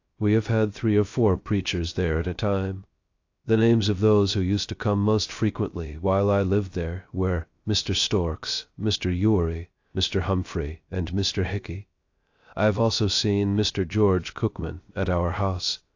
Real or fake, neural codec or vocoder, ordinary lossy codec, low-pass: fake; codec, 16 kHz, 0.3 kbps, FocalCodec; AAC, 48 kbps; 7.2 kHz